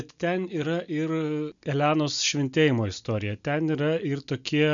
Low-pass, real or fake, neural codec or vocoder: 7.2 kHz; real; none